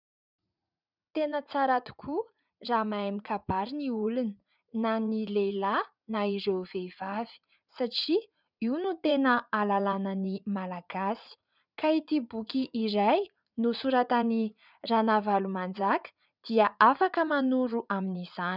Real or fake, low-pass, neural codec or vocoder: fake; 5.4 kHz; vocoder, 44.1 kHz, 80 mel bands, Vocos